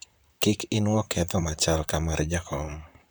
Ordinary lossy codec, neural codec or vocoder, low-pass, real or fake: none; vocoder, 44.1 kHz, 128 mel bands, Pupu-Vocoder; none; fake